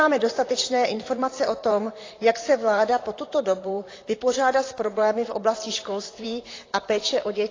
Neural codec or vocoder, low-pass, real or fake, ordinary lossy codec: vocoder, 44.1 kHz, 128 mel bands, Pupu-Vocoder; 7.2 kHz; fake; AAC, 32 kbps